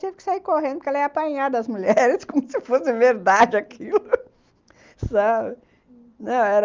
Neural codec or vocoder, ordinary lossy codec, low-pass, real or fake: none; Opus, 24 kbps; 7.2 kHz; real